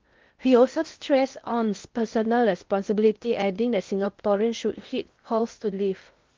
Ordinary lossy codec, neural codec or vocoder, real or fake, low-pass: Opus, 32 kbps; codec, 16 kHz in and 24 kHz out, 0.6 kbps, FocalCodec, streaming, 4096 codes; fake; 7.2 kHz